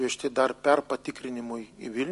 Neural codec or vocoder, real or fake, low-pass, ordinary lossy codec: none; real; 14.4 kHz; MP3, 48 kbps